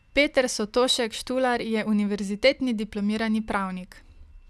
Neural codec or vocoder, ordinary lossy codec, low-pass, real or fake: none; none; none; real